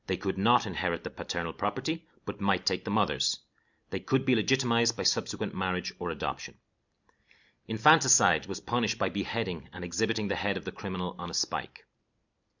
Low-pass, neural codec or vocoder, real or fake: 7.2 kHz; none; real